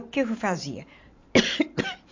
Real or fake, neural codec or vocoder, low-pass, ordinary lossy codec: fake; vocoder, 44.1 kHz, 128 mel bands every 512 samples, BigVGAN v2; 7.2 kHz; MP3, 48 kbps